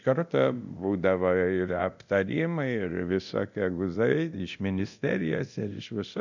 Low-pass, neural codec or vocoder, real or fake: 7.2 kHz; codec, 24 kHz, 0.9 kbps, DualCodec; fake